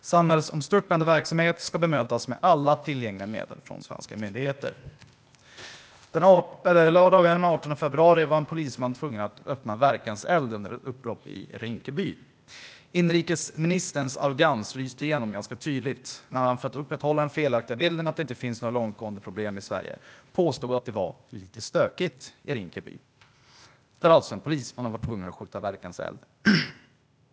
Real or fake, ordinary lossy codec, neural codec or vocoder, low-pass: fake; none; codec, 16 kHz, 0.8 kbps, ZipCodec; none